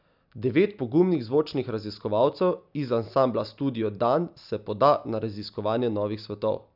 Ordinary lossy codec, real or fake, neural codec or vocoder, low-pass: none; real; none; 5.4 kHz